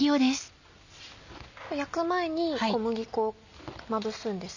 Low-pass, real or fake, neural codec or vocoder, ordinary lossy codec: 7.2 kHz; real; none; none